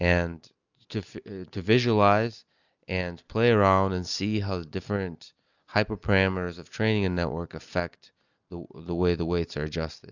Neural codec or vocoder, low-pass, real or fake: none; 7.2 kHz; real